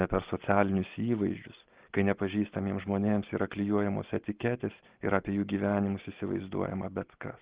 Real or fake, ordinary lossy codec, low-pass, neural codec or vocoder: real; Opus, 16 kbps; 3.6 kHz; none